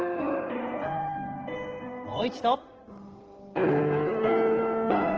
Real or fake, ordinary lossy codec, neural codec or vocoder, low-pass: fake; Opus, 16 kbps; codec, 16 kHz in and 24 kHz out, 2.2 kbps, FireRedTTS-2 codec; 7.2 kHz